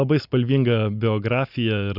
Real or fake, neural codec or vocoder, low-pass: real; none; 5.4 kHz